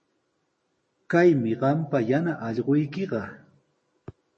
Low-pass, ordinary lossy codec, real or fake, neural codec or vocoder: 10.8 kHz; MP3, 32 kbps; real; none